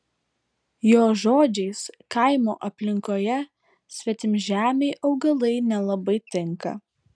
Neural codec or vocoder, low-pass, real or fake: none; 9.9 kHz; real